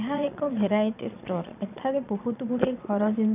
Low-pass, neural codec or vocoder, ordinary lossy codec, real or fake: 3.6 kHz; vocoder, 22.05 kHz, 80 mel bands, Vocos; none; fake